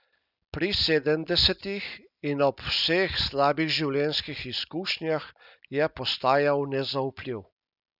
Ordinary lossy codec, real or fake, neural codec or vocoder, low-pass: none; real; none; 5.4 kHz